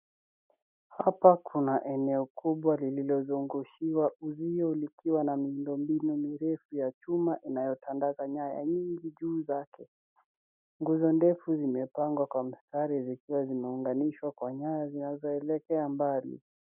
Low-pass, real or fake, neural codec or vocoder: 3.6 kHz; real; none